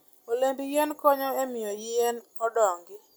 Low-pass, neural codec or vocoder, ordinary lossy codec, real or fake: none; none; none; real